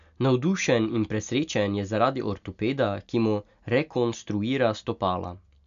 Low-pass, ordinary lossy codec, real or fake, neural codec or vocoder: 7.2 kHz; none; real; none